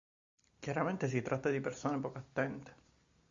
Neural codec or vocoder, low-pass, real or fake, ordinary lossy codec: none; 7.2 kHz; real; Opus, 64 kbps